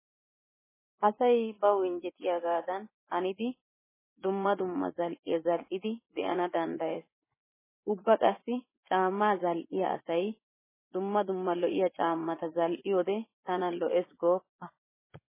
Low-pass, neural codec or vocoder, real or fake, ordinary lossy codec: 3.6 kHz; vocoder, 24 kHz, 100 mel bands, Vocos; fake; MP3, 16 kbps